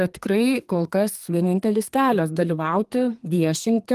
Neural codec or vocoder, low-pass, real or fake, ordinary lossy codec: codec, 32 kHz, 1.9 kbps, SNAC; 14.4 kHz; fake; Opus, 24 kbps